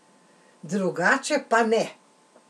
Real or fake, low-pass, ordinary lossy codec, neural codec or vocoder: real; none; none; none